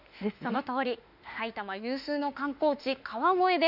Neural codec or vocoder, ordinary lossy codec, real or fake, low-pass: autoencoder, 48 kHz, 32 numbers a frame, DAC-VAE, trained on Japanese speech; none; fake; 5.4 kHz